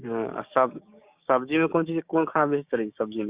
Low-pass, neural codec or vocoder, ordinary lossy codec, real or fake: 3.6 kHz; codec, 16 kHz, 6 kbps, DAC; Opus, 64 kbps; fake